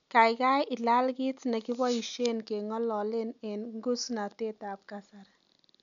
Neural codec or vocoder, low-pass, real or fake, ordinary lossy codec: none; 7.2 kHz; real; none